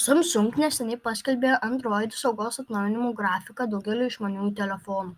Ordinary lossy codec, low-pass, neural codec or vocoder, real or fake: Opus, 32 kbps; 14.4 kHz; none; real